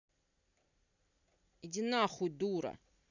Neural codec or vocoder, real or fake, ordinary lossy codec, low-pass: none; real; none; 7.2 kHz